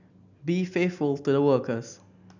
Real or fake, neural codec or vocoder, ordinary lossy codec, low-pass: real; none; none; 7.2 kHz